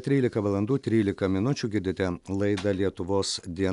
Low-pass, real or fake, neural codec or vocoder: 10.8 kHz; real; none